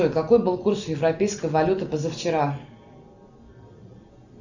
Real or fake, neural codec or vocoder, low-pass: real; none; 7.2 kHz